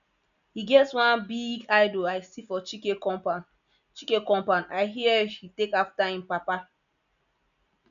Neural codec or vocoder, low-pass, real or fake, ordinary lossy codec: none; 7.2 kHz; real; none